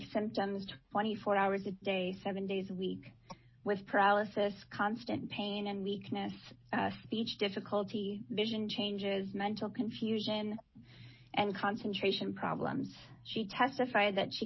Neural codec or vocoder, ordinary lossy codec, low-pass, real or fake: none; MP3, 24 kbps; 7.2 kHz; real